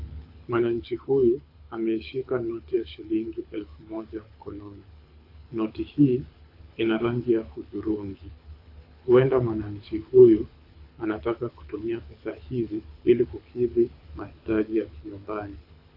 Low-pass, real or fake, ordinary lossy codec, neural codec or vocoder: 5.4 kHz; fake; AAC, 32 kbps; codec, 24 kHz, 6 kbps, HILCodec